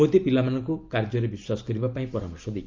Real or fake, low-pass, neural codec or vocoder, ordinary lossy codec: real; 7.2 kHz; none; Opus, 24 kbps